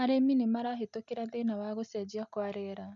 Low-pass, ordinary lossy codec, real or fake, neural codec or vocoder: 7.2 kHz; MP3, 64 kbps; real; none